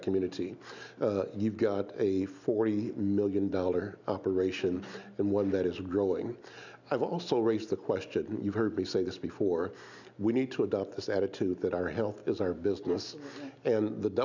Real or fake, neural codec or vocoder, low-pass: real; none; 7.2 kHz